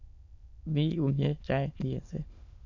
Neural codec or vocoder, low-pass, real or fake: autoencoder, 22.05 kHz, a latent of 192 numbers a frame, VITS, trained on many speakers; 7.2 kHz; fake